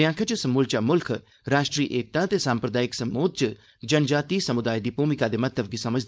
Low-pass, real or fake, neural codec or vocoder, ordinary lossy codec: none; fake; codec, 16 kHz, 4.8 kbps, FACodec; none